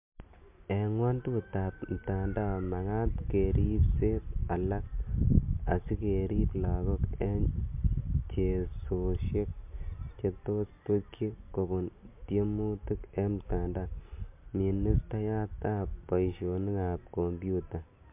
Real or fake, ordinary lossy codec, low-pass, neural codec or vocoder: real; MP3, 32 kbps; 3.6 kHz; none